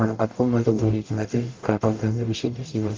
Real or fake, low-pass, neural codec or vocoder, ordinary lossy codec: fake; 7.2 kHz; codec, 44.1 kHz, 0.9 kbps, DAC; Opus, 32 kbps